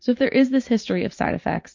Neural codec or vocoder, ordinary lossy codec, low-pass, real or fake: vocoder, 44.1 kHz, 128 mel bands every 512 samples, BigVGAN v2; MP3, 48 kbps; 7.2 kHz; fake